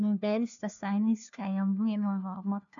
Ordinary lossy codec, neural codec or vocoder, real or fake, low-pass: none; codec, 16 kHz, 1 kbps, FunCodec, trained on LibriTTS, 50 frames a second; fake; 7.2 kHz